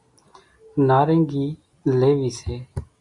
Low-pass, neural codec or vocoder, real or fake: 10.8 kHz; none; real